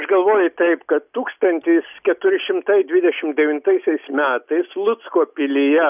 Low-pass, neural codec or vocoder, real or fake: 3.6 kHz; none; real